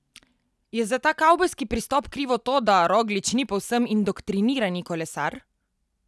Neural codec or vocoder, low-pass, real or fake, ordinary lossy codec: none; none; real; none